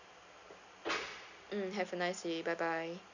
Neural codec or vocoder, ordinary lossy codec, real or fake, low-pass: none; none; real; 7.2 kHz